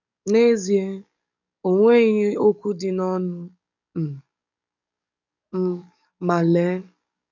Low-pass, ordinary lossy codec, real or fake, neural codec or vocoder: 7.2 kHz; none; fake; codec, 44.1 kHz, 7.8 kbps, DAC